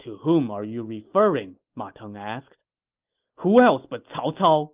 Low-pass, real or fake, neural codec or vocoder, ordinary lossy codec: 3.6 kHz; real; none; Opus, 32 kbps